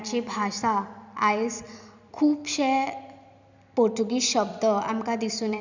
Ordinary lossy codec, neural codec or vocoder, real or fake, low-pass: none; vocoder, 44.1 kHz, 128 mel bands every 256 samples, BigVGAN v2; fake; 7.2 kHz